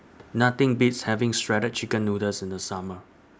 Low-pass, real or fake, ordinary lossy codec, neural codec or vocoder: none; real; none; none